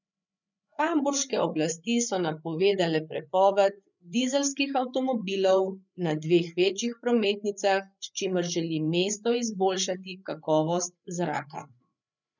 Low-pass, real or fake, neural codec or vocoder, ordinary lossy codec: 7.2 kHz; fake; codec, 16 kHz, 8 kbps, FreqCodec, larger model; none